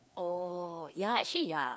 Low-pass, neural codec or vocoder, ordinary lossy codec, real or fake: none; codec, 16 kHz, 2 kbps, FreqCodec, larger model; none; fake